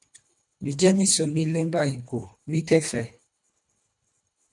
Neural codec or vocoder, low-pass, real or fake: codec, 24 kHz, 1.5 kbps, HILCodec; 10.8 kHz; fake